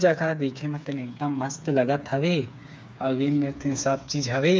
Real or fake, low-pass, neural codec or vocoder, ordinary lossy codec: fake; none; codec, 16 kHz, 4 kbps, FreqCodec, smaller model; none